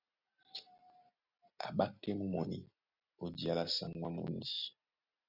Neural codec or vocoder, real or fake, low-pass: none; real; 5.4 kHz